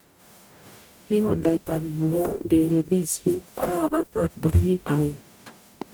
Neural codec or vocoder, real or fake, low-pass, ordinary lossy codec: codec, 44.1 kHz, 0.9 kbps, DAC; fake; none; none